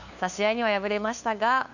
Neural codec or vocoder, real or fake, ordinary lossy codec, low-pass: codec, 16 kHz, 2 kbps, FunCodec, trained on LibriTTS, 25 frames a second; fake; none; 7.2 kHz